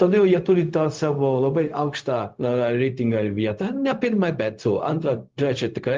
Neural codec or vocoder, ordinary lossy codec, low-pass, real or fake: codec, 16 kHz, 0.4 kbps, LongCat-Audio-Codec; Opus, 32 kbps; 7.2 kHz; fake